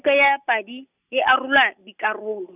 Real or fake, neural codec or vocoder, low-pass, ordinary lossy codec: real; none; 3.6 kHz; none